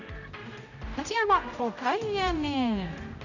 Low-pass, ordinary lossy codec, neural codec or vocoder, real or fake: 7.2 kHz; none; codec, 16 kHz, 0.5 kbps, X-Codec, HuBERT features, trained on general audio; fake